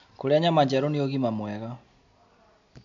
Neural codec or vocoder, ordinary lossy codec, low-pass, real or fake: none; MP3, 64 kbps; 7.2 kHz; real